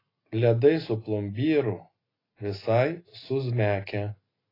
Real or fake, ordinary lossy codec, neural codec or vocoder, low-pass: real; AAC, 24 kbps; none; 5.4 kHz